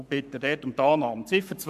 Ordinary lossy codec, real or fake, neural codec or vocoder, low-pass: none; fake; codec, 44.1 kHz, 7.8 kbps, Pupu-Codec; 14.4 kHz